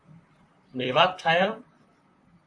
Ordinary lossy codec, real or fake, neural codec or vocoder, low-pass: AAC, 64 kbps; fake; vocoder, 44.1 kHz, 128 mel bands, Pupu-Vocoder; 9.9 kHz